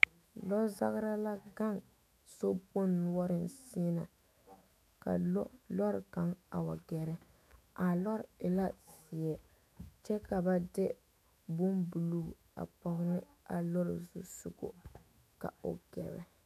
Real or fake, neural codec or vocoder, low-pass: fake; autoencoder, 48 kHz, 128 numbers a frame, DAC-VAE, trained on Japanese speech; 14.4 kHz